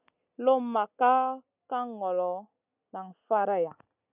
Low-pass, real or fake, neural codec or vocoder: 3.6 kHz; real; none